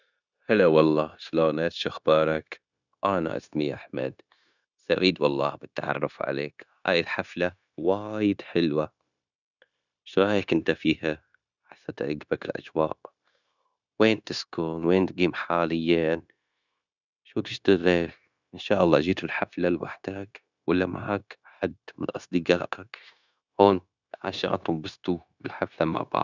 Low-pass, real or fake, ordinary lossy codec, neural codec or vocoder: 7.2 kHz; fake; none; codec, 16 kHz, 0.9 kbps, LongCat-Audio-Codec